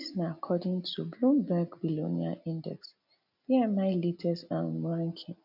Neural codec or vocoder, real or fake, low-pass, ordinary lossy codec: none; real; 5.4 kHz; none